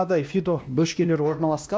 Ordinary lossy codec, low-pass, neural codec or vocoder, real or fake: none; none; codec, 16 kHz, 1 kbps, X-Codec, WavLM features, trained on Multilingual LibriSpeech; fake